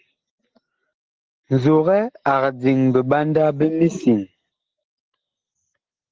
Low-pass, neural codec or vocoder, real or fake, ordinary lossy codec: 7.2 kHz; none; real; Opus, 16 kbps